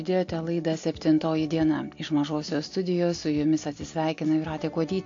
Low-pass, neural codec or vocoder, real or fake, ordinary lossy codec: 7.2 kHz; none; real; AAC, 48 kbps